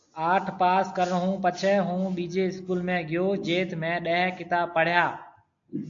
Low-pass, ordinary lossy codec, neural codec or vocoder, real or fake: 7.2 kHz; AAC, 64 kbps; none; real